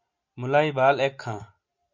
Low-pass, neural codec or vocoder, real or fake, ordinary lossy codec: 7.2 kHz; none; real; MP3, 64 kbps